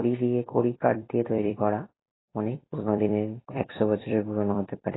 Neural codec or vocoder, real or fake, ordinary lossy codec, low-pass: vocoder, 44.1 kHz, 128 mel bands every 256 samples, BigVGAN v2; fake; AAC, 16 kbps; 7.2 kHz